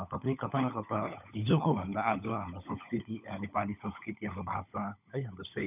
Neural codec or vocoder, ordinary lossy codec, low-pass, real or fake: codec, 16 kHz, 16 kbps, FunCodec, trained on LibriTTS, 50 frames a second; none; 3.6 kHz; fake